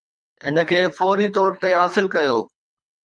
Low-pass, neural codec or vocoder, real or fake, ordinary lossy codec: 9.9 kHz; codec, 24 kHz, 3 kbps, HILCodec; fake; MP3, 96 kbps